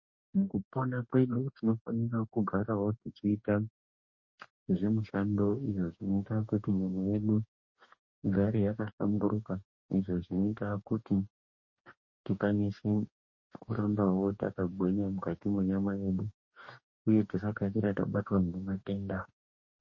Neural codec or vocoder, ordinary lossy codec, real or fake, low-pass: codec, 44.1 kHz, 2.6 kbps, DAC; MP3, 32 kbps; fake; 7.2 kHz